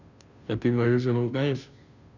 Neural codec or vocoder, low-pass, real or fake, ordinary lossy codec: codec, 16 kHz, 0.5 kbps, FunCodec, trained on Chinese and English, 25 frames a second; 7.2 kHz; fake; none